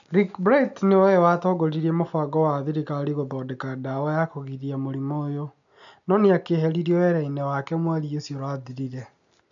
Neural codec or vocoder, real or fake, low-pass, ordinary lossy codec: none; real; 7.2 kHz; none